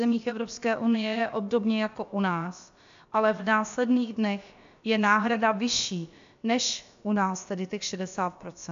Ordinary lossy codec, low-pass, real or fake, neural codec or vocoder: AAC, 64 kbps; 7.2 kHz; fake; codec, 16 kHz, about 1 kbps, DyCAST, with the encoder's durations